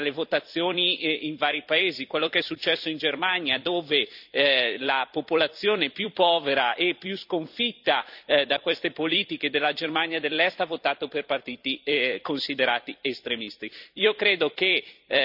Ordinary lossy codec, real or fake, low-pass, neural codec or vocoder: none; fake; 5.4 kHz; vocoder, 44.1 kHz, 128 mel bands every 256 samples, BigVGAN v2